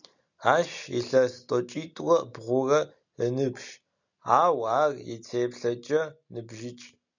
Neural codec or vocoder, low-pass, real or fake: none; 7.2 kHz; real